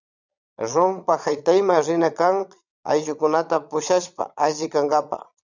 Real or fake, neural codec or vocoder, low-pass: fake; vocoder, 22.05 kHz, 80 mel bands, Vocos; 7.2 kHz